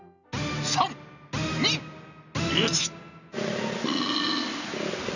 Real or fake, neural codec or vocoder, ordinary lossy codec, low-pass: real; none; none; 7.2 kHz